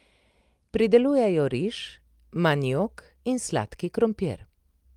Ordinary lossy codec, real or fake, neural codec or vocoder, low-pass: Opus, 32 kbps; real; none; 19.8 kHz